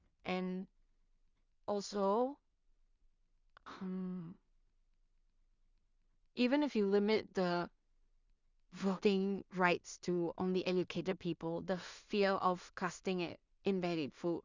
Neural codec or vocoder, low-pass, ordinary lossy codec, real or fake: codec, 16 kHz in and 24 kHz out, 0.4 kbps, LongCat-Audio-Codec, two codebook decoder; 7.2 kHz; Opus, 64 kbps; fake